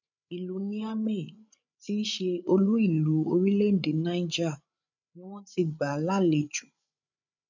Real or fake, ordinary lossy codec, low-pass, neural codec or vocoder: fake; none; 7.2 kHz; codec, 16 kHz, 8 kbps, FreqCodec, larger model